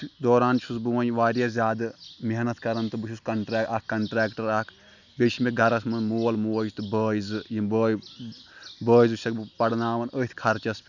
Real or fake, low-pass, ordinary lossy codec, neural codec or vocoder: real; 7.2 kHz; none; none